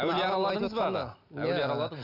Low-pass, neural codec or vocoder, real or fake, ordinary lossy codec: 5.4 kHz; none; real; none